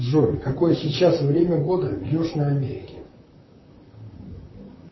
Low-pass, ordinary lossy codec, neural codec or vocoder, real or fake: 7.2 kHz; MP3, 24 kbps; vocoder, 44.1 kHz, 128 mel bands, Pupu-Vocoder; fake